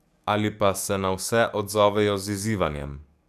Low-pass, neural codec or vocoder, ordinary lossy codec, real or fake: 14.4 kHz; codec, 44.1 kHz, 7.8 kbps, DAC; none; fake